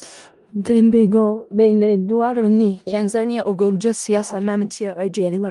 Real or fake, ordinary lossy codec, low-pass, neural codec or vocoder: fake; Opus, 32 kbps; 10.8 kHz; codec, 16 kHz in and 24 kHz out, 0.4 kbps, LongCat-Audio-Codec, four codebook decoder